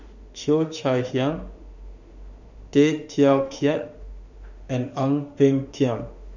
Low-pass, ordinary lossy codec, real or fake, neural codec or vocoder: 7.2 kHz; none; fake; autoencoder, 48 kHz, 32 numbers a frame, DAC-VAE, trained on Japanese speech